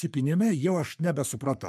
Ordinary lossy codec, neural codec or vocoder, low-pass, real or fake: MP3, 96 kbps; codec, 44.1 kHz, 3.4 kbps, Pupu-Codec; 14.4 kHz; fake